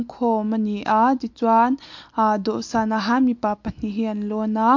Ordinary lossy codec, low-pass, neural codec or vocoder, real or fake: MP3, 48 kbps; 7.2 kHz; none; real